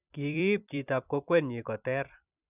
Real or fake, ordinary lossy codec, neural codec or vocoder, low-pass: fake; none; vocoder, 44.1 kHz, 128 mel bands every 256 samples, BigVGAN v2; 3.6 kHz